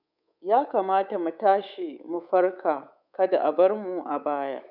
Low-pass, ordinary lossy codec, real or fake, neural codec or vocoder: 5.4 kHz; none; fake; codec, 24 kHz, 3.1 kbps, DualCodec